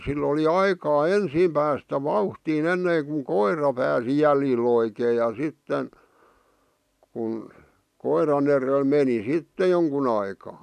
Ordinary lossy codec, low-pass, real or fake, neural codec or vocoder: none; 14.4 kHz; real; none